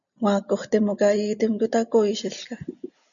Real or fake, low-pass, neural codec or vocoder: real; 7.2 kHz; none